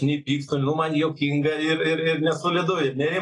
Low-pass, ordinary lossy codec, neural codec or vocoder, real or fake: 10.8 kHz; AAC, 32 kbps; none; real